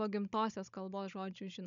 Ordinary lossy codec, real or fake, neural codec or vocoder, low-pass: MP3, 64 kbps; fake; codec, 16 kHz, 16 kbps, FunCodec, trained on Chinese and English, 50 frames a second; 7.2 kHz